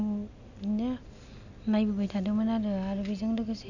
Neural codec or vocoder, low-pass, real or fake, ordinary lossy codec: none; 7.2 kHz; real; none